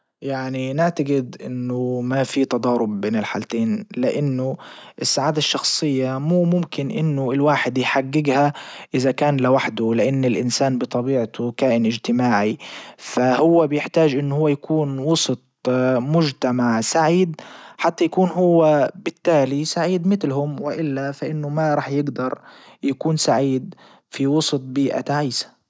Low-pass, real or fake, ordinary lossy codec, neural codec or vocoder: none; real; none; none